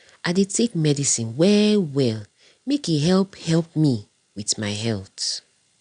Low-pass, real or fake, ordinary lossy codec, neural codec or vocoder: 9.9 kHz; real; none; none